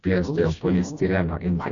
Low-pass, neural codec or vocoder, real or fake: 7.2 kHz; codec, 16 kHz, 1 kbps, FreqCodec, smaller model; fake